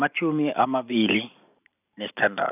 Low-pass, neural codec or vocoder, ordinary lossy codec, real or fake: 3.6 kHz; vocoder, 44.1 kHz, 128 mel bands every 512 samples, BigVGAN v2; none; fake